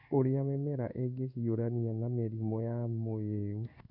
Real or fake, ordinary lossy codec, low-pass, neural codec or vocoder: fake; none; 5.4 kHz; codec, 16 kHz in and 24 kHz out, 1 kbps, XY-Tokenizer